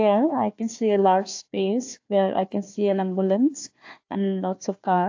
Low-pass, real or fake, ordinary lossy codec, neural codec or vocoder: 7.2 kHz; fake; AAC, 48 kbps; codec, 16 kHz, 1 kbps, FunCodec, trained on Chinese and English, 50 frames a second